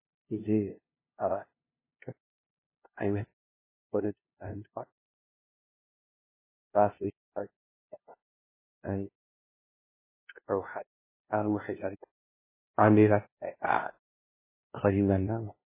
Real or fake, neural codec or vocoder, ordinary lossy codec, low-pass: fake; codec, 16 kHz, 0.5 kbps, FunCodec, trained on LibriTTS, 25 frames a second; MP3, 16 kbps; 3.6 kHz